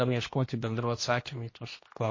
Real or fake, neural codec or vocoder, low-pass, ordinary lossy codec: fake; codec, 16 kHz, 1 kbps, X-Codec, HuBERT features, trained on general audio; 7.2 kHz; MP3, 32 kbps